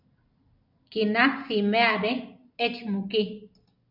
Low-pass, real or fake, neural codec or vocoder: 5.4 kHz; real; none